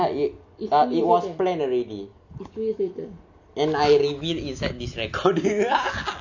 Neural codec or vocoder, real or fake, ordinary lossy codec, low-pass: none; real; none; 7.2 kHz